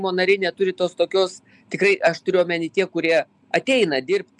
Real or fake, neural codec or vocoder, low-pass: real; none; 10.8 kHz